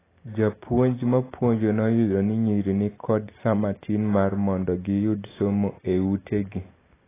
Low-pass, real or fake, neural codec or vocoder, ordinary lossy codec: 3.6 kHz; real; none; AAC, 16 kbps